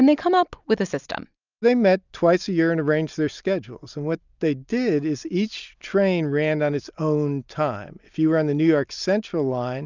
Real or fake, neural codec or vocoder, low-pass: real; none; 7.2 kHz